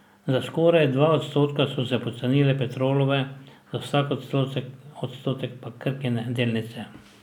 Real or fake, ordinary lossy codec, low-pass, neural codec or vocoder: real; none; 19.8 kHz; none